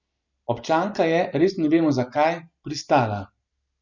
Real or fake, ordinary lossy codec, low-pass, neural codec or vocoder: real; none; 7.2 kHz; none